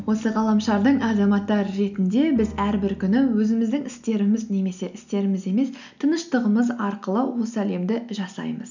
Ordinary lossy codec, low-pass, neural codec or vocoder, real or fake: none; 7.2 kHz; none; real